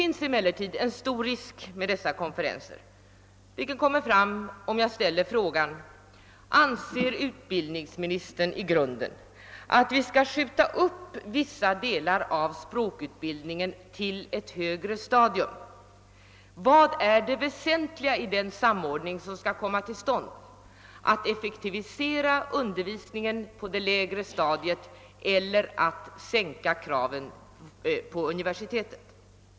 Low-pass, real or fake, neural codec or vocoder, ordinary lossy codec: none; real; none; none